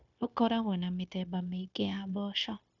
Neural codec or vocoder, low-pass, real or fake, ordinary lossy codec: codec, 16 kHz, 0.9 kbps, LongCat-Audio-Codec; 7.2 kHz; fake; none